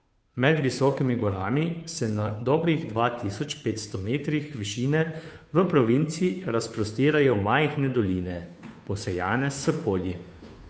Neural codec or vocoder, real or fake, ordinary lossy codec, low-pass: codec, 16 kHz, 2 kbps, FunCodec, trained on Chinese and English, 25 frames a second; fake; none; none